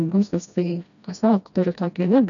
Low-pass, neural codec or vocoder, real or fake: 7.2 kHz; codec, 16 kHz, 1 kbps, FreqCodec, smaller model; fake